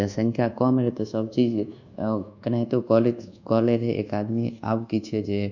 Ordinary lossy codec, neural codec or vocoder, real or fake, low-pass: none; codec, 24 kHz, 1.2 kbps, DualCodec; fake; 7.2 kHz